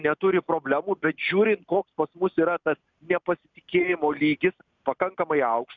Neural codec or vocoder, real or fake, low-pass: none; real; 7.2 kHz